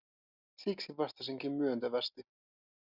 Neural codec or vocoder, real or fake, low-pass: none; real; 5.4 kHz